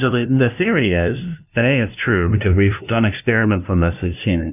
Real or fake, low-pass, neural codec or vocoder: fake; 3.6 kHz; codec, 16 kHz, 0.5 kbps, FunCodec, trained on LibriTTS, 25 frames a second